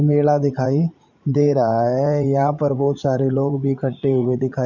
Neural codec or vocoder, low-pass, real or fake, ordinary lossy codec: vocoder, 44.1 kHz, 80 mel bands, Vocos; 7.2 kHz; fake; none